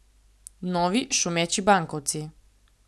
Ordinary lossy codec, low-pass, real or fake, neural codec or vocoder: none; none; real; none